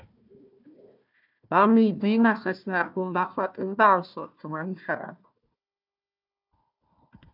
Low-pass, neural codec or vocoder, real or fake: 5.4 kHz; codec, 16 kHz, 1 kbps, FunCodec, trained on Chinese and English, 50 frames a second; fake